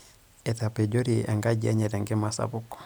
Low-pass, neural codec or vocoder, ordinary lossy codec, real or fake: none; none; none; real